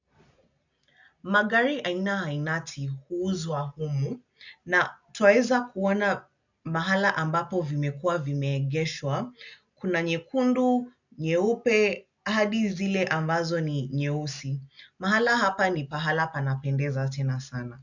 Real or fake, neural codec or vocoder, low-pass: real; none; 7.2 kHz